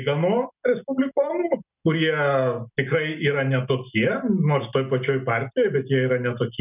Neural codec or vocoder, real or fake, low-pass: none; real; 3.6 kHz